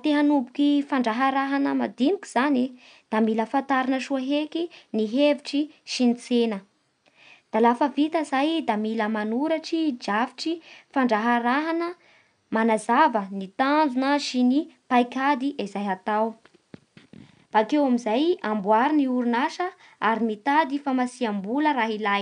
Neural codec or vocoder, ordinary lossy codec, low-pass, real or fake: none; none; 9.9 kHz; real